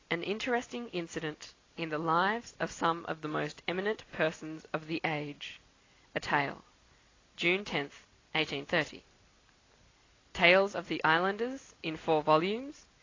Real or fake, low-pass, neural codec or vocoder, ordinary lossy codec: real; 7.2 kHz; none; AAC, 32 kbps